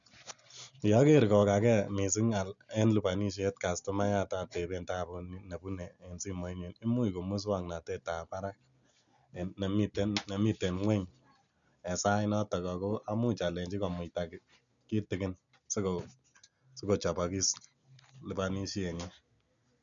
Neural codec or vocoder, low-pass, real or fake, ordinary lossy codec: none; 7.2 kHz; real; none